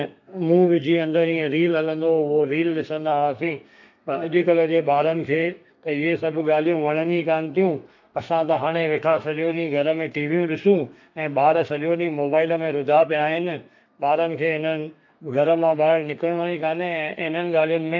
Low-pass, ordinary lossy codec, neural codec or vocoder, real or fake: 7.2 kHz; AAC, 48 kbps; codec, 32 kHz, 1.9 kbps, SNAC; fake